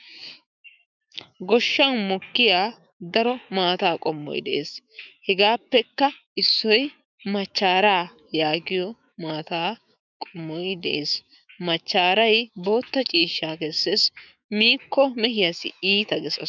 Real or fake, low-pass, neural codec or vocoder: fake; 7.2 kHz; autoencoder, 48 kHz, 128 numbers a frame, DAC-VAE, trained on Japanese speech